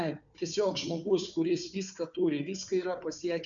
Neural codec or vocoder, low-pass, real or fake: codec, 16 kHz, 4 kbps, FunCodec, trained on Chinese and English, 50 frames a second; 7.2 kHz; fake